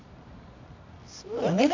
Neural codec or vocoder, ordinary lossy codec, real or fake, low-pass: codec, 24 kHz, 0.9 kbps, WavTokenizer, medium music audio release; none; fake; 7.2 kHz